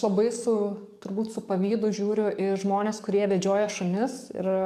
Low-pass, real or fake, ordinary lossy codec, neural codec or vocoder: 14.4 kHz; fake; MP3, 96 kbps; codec, 44.1 kHz, 7.8 kbps, DAC